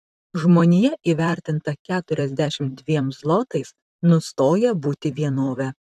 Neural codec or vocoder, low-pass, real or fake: vocoder, 44.1 kHz, 128 mel bands, Pupu-Vocoder; 14.4 kHz; fake